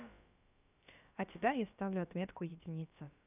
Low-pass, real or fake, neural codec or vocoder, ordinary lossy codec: 3.6 kHz; fake; codec, 16 kHz, about 1 kbps, DyCAST, with the encoder's durations; none